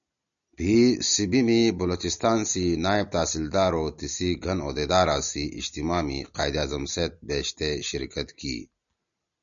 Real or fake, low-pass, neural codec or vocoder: real; 7.2 kHz; none